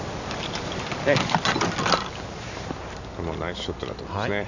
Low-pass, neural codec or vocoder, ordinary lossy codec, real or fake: 7.2 kHz; none; none; real